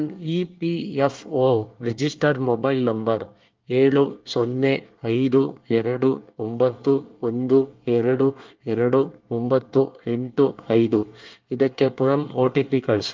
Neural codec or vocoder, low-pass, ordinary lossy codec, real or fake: codec, 24 kHz, 1 kbps, SNAC; 7.2 kHz; Opus, 32 kbps; fake